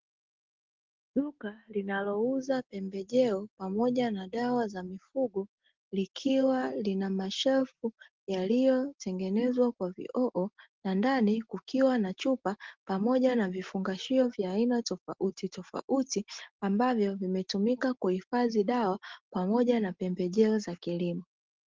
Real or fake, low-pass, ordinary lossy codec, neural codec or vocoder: real; 7.2 kHz; Opus, 16 kbps; none